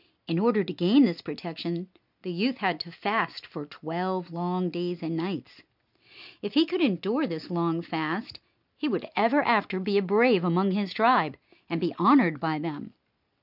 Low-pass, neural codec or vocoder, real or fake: 5.4 kHz; none; real